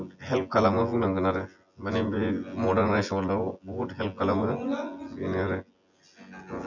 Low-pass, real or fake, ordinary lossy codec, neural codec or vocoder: 7.2 kHz; fake; none; vocoder, 24 kHz, 100 mel bands, Vocos